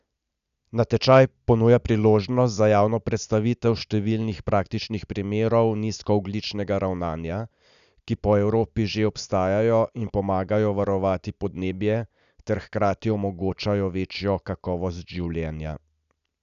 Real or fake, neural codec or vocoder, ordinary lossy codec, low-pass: real; none; none; 7.2 kHz